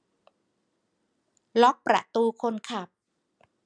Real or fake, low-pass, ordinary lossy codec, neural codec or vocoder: real; 9.9 kHz; none; none